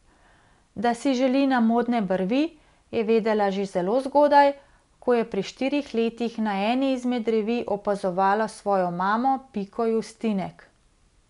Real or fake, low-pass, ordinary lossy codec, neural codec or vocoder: real; 10.8 kHz; none; none